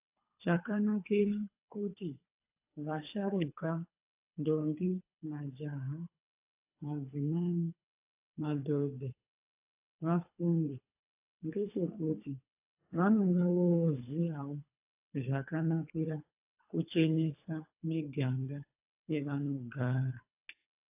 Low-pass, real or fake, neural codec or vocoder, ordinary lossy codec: 3.6 kHz; fake; codec, 24 kHz, 3 kbps, HILCodec; AAC, 32 kbps